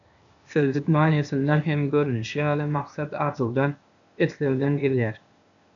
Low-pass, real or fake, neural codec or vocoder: 7.2 kHz; fake; codec, 16 kHz, 0.8 kbps, ZipCodec